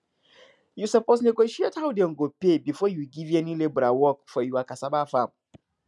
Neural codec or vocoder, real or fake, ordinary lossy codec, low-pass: none; real; none; none